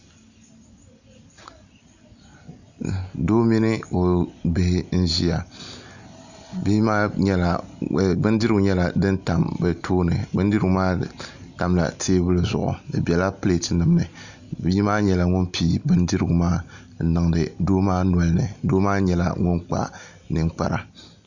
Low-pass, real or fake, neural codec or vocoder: 7.2 kHz; real; none